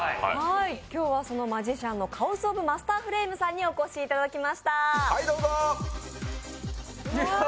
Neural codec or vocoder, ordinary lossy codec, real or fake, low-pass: none; none; real; none